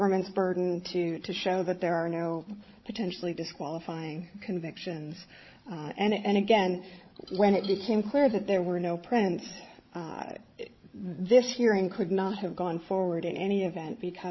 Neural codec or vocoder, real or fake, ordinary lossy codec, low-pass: codec, 16 kHz, 16 kbps, FreqCodec, larger model; fake; MP3, 24 kbps; 7.2 kHz